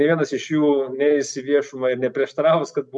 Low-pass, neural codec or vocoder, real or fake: 10.8 kHz; none; real